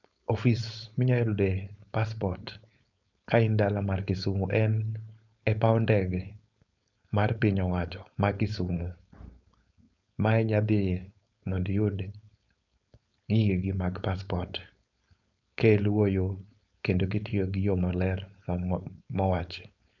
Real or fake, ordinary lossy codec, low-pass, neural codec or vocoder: fake; none; 7.2 kHz; codec, 16 kHz, 4.8 kbps, FACodec